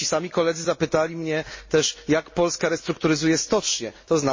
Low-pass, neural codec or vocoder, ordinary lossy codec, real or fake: 7.2 kHz; none; MP3, 32 kbps; real